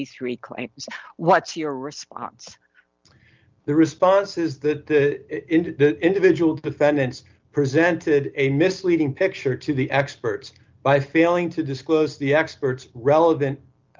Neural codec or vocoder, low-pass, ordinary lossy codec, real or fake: none; 7.2 kHz; Opus, 24 kbps; real